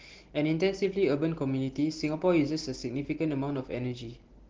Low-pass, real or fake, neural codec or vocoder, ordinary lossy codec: 7.2 kHz; real; none; Opus, 16 kbps